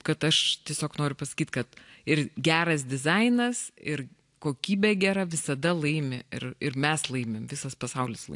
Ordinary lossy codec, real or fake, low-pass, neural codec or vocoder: AAC, 64 kbps; real; 10.8 kHz; none